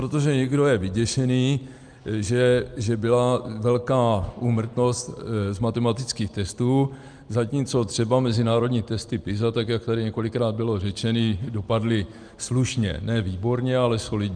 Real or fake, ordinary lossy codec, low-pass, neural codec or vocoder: real; Opus, 32 kbps; 9.9 kHz; none